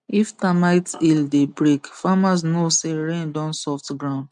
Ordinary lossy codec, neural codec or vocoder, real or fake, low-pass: MP3, 64 kbps; none; real; 10.8 kHz